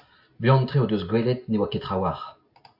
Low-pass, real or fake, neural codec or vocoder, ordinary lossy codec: 5.4 kHz; real; none; AAC, 48 kbps